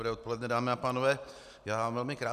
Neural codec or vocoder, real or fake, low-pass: none; real; 14.4 kHz